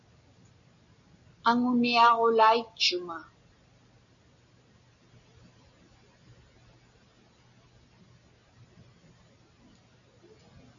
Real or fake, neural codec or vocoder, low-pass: real; none; 7.2 kHz